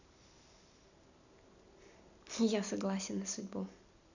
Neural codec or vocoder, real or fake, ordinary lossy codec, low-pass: none; real; none; 7.2 kHz